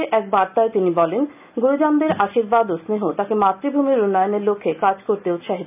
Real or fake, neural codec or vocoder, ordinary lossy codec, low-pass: real; none; none; 3.6 kHz